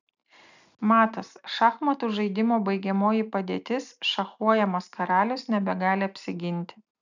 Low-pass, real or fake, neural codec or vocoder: 7.2 kHz; real; none